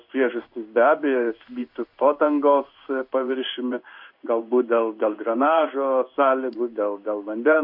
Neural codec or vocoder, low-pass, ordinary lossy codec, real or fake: codec, 16 kHz in and 24 kHz out, 1 kbps, XY-Tokenizer; 5.4 kHz; MP3, 48 kbps; fake